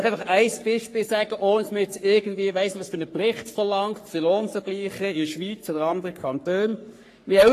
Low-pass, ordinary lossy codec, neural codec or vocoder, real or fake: 14.4 kHz; AAC, 48 kbps; codec, 44.1 kHz, 3.4 kbps, Pupu-Codec; fake